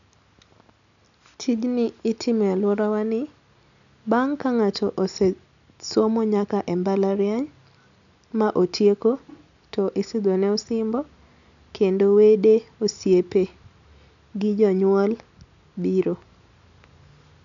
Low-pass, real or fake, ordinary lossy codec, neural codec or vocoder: 7.2 kHz; real; none; none